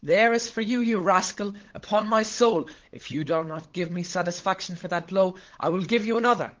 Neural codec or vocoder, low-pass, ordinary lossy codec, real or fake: codec, 16 kHz, 8 kbps, FunCodec, trained on LibriTTS, 25 frames a second; 7.2 kHz; Opus, 16 kbps; fake